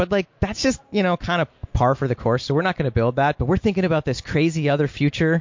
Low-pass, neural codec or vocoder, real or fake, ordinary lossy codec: 7.2 kHz; none; real; MP3, 48 kbps